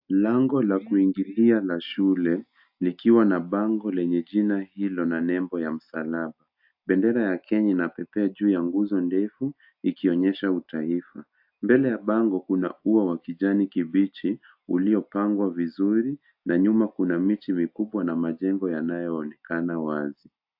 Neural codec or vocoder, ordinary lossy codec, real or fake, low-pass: none; AAC, 48 kbps; real; 5.4 kHz